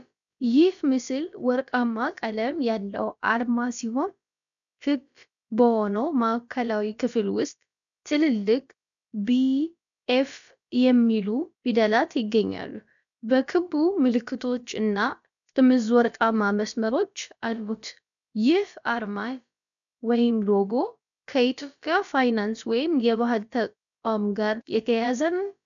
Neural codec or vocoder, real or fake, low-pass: codec, 16 kHz, about 1 kbps, DyCAST, with the encoder's durations; fake; 7.2 kHz